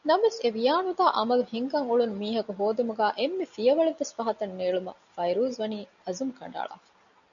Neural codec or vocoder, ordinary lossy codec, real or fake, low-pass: none; MP3, 96 kbps; real; 7.2 kHz